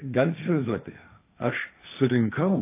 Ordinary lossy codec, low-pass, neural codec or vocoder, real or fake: AAC, 24 kbps; 3.6 kHz; codec, 16 kHz, 1.1 kbps, Voila-Tokenizer; fake